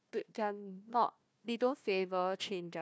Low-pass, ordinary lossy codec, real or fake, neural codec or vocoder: none; none; fake; codec, 16 kHz, 1 kbps, FunCodec, trained on Chinese and English, 50 frames a second